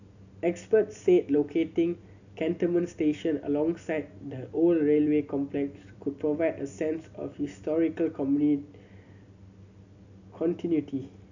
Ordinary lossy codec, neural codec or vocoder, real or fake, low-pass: none; none; real; 7.2 kHz